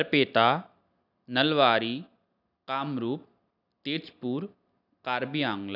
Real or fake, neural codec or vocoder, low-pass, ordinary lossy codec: real; none; 5.4 kHz; none